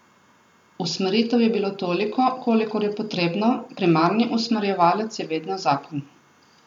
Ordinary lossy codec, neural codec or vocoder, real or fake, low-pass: none; none; real; 19.8 kHz